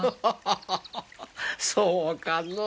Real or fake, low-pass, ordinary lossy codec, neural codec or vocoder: real; none; none; none